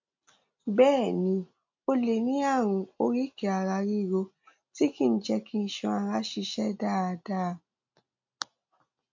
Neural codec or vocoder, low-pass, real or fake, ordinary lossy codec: none; 7.2 kHz; real; MP3, 48 kbps